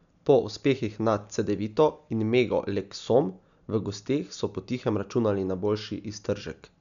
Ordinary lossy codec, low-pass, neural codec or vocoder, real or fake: none; 7.2 kHz; none; real